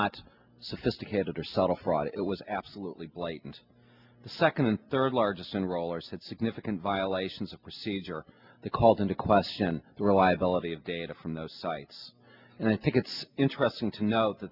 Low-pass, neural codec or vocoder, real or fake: 5.4 kHz; none; real